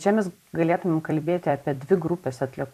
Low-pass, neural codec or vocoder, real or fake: 14.4 kHz; vocoder, 44.1 kHz, 128 mel bands every 256 samples, BigVGAN v2; fake